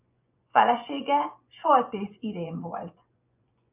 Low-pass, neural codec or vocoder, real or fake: 3.6 kHz; vocoder, 24 kHz, 100 mel bands, Vocos; fake